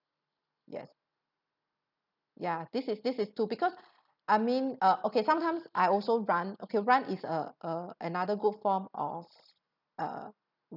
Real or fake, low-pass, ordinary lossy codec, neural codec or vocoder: real; 5.4 kHz; none; none